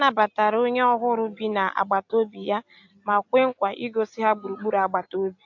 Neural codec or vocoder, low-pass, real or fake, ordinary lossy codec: none; 7.2 kHz; real; AAC, 48 kbps